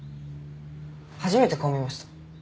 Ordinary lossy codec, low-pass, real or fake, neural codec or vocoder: none; none; real; none